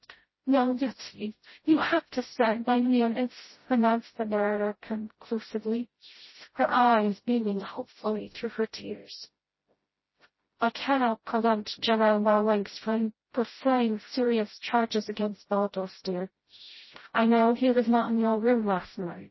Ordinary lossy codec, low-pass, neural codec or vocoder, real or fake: MP3, 24 kbps; 7.2 kHz; codec, 16 kHz, 0.5 kbps, FreqCodec, smaller model; fake